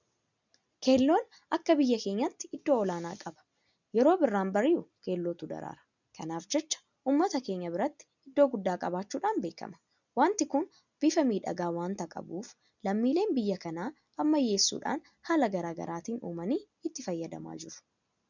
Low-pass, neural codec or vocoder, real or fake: 7.2 kHz; none; real